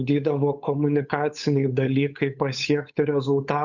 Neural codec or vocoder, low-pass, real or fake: codec, 16 kHz, 8 kbps, FunCodec, trained on Chinese and English, 25 frames a second; 7.2 kHz; fake